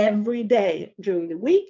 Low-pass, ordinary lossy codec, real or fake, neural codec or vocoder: 7.2 kHz; MP3, 64 kbps; fake; vocoder, 22.05 kHz, 80 mel bands, Vocos